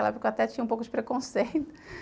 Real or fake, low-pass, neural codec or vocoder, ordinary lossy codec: real; none; none; none